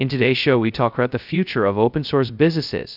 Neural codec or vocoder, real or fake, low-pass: codec, 16 kHz, 0.2 kbps, FocalCodec; fake; 5.4 kHz